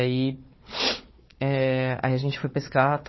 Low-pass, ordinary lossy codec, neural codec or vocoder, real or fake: 7.2 kHz; MP3, 24 kbps; codec, 16 kHz, 4 kbps, FunCodec, trained on Chinese and English, 50 frames a second; fake